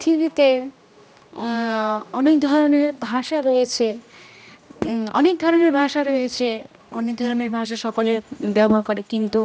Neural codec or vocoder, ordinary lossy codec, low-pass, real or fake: codec, 16 kHz, 1 kbps, X-Codec, HuBERT features, trained on general audio; none; none; fake